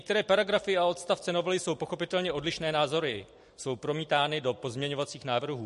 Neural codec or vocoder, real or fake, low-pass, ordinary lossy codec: none; real; 14.4 kHz; MP3, 48 kbps